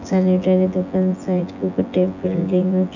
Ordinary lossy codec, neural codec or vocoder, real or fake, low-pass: none; vocoder, 24 kHz, 100 mel bands, Vocos; fake; 7.2 kHz